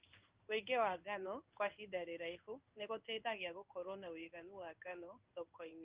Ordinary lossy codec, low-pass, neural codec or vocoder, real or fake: Opus, 24 kbps; 3.6 kHz; codec, 16 kHz in and 24 kHz out, 1 kbps, XY-Tokenizer; fake